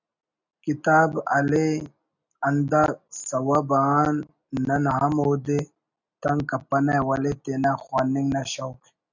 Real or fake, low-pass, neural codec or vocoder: real; 7.2 kHz; none